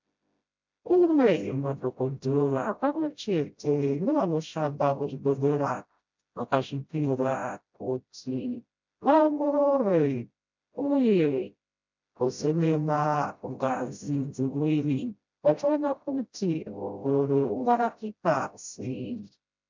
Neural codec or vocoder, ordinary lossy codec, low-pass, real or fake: codec, 16 kHz, 0.5 kbps, FreqCodec, smaller model; MP3, 64 kbps; 7.2 kHz; fake